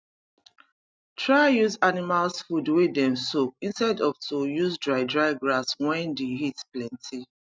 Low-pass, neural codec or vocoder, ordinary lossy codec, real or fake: none; none; none; real